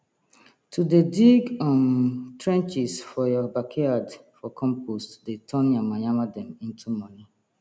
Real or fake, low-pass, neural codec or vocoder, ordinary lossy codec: real; none; none; none